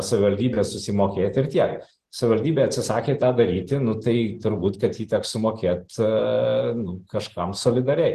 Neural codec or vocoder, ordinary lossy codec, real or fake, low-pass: none; Opus, 16 kbps; real; 14.4 kHz